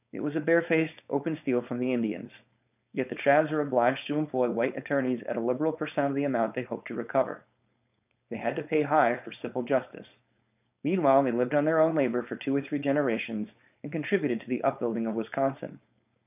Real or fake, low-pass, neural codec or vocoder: fake; 3.6 kHz; codec, 16 kHz, 4.8 kbps, FACodec